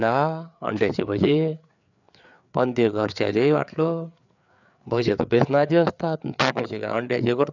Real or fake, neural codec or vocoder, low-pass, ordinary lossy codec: fake; codec, 16 kHz, 4 kbps, FreqCodec, larger model; 7.2 kHz; none